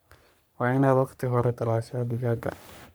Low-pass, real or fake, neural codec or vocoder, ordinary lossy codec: none; fake; codec, 44.1 kHz, 3.4 kbps, Pupu-Codec; none